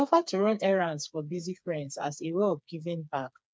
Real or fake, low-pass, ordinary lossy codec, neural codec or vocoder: fake; none; none; codec, 16 kHz, 4 kbps, FreqCodec, smaller model